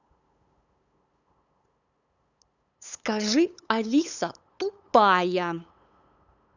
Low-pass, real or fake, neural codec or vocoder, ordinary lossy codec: 7.2 kHz; fake; codec, 16 kHz, 8 kbps, FunCodec, trained on LibriTTS, 25 frames a second; none